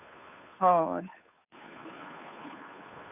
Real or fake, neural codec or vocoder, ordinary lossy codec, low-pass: fake; codec, 16 kHz, 2 kbps, FunCodec, trained on Chinese and English, 25 frames a second; none; 3.6 kHz